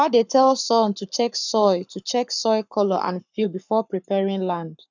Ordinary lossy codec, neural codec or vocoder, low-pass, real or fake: none; codec, 44.1 kHz, 7.8 kbps, Pupu-Codec; 7.2 kHz; fake